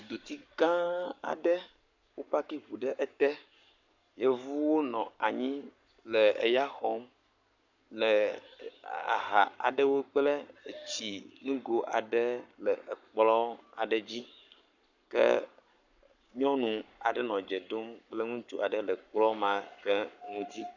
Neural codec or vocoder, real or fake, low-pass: codec, 44.1 kHz, 7.8 kbps, Pupu-Codec; fake; 7.2 kHz